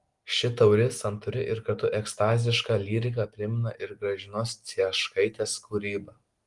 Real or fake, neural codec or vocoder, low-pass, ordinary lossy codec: real; none; 10.8 kHz; Opus, 24 kbps